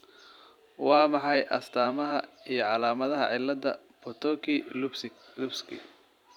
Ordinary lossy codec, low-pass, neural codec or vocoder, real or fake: none; 19.8 kHz; vocoder, 44.1 kHz, 128 mel bands every 512 samples, BigVGAN v2; fake